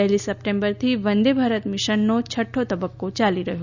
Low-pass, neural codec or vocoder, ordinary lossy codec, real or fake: 7.2 kHz; none; none; real